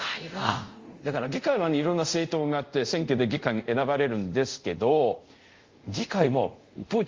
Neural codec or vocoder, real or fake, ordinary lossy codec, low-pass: codec, 24 kHz, 0.5 kbps, DualCodec; fake; Opus, 32 kbps; 7.2 kHz